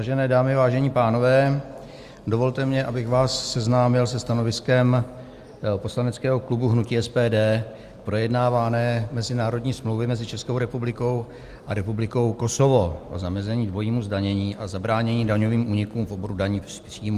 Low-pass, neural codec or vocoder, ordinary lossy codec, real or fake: 14.4 kHz; none; Opus, 32 kbps; real